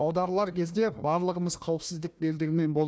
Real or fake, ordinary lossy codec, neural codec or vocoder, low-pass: fake; none; codec, 16 kHz, 1 kbps, FunCodec, trained on Chinese and English, 50 frames a second; none